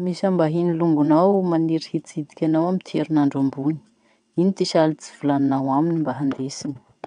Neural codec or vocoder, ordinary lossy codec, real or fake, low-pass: vocoder, 22.05 kHz, 80 mel bands, WaveNeXt; none; fake; 9.9 kHz